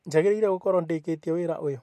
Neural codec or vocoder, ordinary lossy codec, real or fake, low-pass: none; MP3, 64 kbps; real; 14.4 kHz